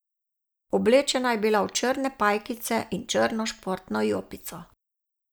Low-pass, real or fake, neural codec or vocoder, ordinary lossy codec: none; real; none; none